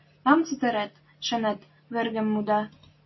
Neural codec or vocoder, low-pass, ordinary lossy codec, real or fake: none; 7.2 kHz; MP3, 24 kbps; real